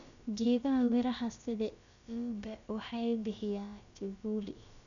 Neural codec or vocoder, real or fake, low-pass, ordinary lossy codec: codec, 16 kHz, about 1 kbps, DyCAST, with the encoder's durations; fake; 7.2 kHz; none